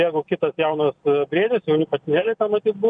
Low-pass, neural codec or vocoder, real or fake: 9.9 kHz; none; real